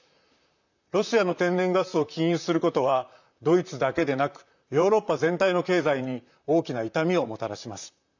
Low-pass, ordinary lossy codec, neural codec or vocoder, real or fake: 7.2 kHz; none; vocoder, 44.1 kHz, 128 mel bands, Pupu-Vocoder; fake